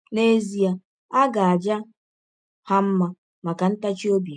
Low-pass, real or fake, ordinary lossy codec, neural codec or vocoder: 9.9 kHz; real; none; none